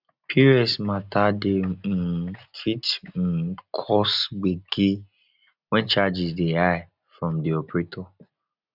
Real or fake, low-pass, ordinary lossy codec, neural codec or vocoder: real; 5.4 kHz; none; none